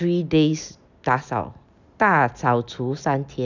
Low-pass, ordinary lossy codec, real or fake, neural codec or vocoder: 7.2 kHz; none; real; none